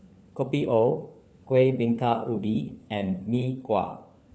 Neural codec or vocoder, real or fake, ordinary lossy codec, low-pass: codec, 16 kHz, 4 kbps, FunCodec, trained on LibriTTS, 50 frames a second; fake; none; none